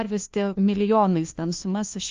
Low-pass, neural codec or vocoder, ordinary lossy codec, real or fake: 7.2 kHz; codec, 16 kHz, 1 kbps, FunCodec, trained on LibriTTS, 50 frames a second; Opus, 24 kbps; fake